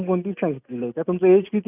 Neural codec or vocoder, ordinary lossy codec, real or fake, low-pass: none; none; real; 3.6 kHz